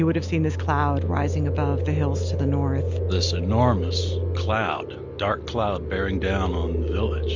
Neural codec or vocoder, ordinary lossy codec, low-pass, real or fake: none; MP3, 64 kbps; 7.2 kHz; real